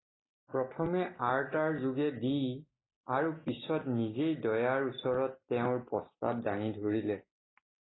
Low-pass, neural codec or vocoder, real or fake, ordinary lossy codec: 7.2 kHz; none; real; AAC, 16 kbps